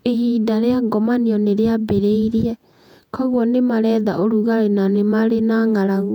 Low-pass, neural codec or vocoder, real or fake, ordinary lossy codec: 19.8 kHz; vocoder, 48 kHz, 128 mel bands, Vocos; fake; none